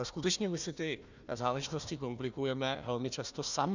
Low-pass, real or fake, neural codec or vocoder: 7.2 kHz; fake; codec, 16 kHz, 1 kbps, FunCodec, trained on Chinese and English, 50 frames a second